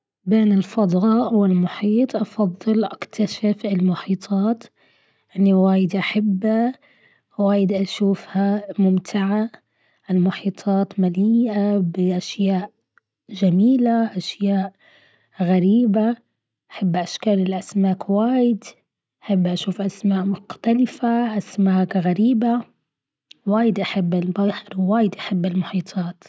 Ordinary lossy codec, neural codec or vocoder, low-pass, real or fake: none; none; none; real